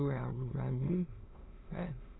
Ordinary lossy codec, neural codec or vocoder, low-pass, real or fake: AAC, 16 kbps; autoencoder, 22.05 kHz, a latent of 192 numbers a frame, VITS, trained on many speakers; 7.2 kHz; fake